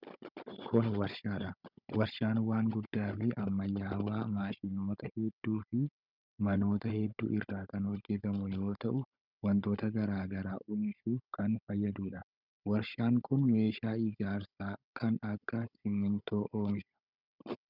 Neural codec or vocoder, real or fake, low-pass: codec, 16 kHz, 16 kbps, FunCodec, trained on Chinese and English, 50 frames a second; fake; 5.4 kHz